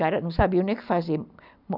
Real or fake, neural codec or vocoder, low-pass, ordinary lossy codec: real; none; 5.4 kHz; none